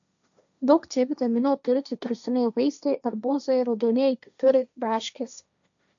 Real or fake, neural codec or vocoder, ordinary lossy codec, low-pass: fake; codec, 16 kHz, 1.1 kbps, Voila-Tokenizer; MP3, 96 kbps; 7.2 kHz